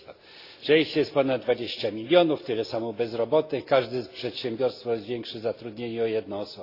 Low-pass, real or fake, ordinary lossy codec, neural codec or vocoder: 5.4 kHz; real; AAC, 32 kbps; none